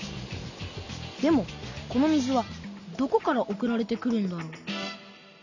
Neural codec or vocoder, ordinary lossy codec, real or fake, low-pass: none; none; real; 7.2 kHz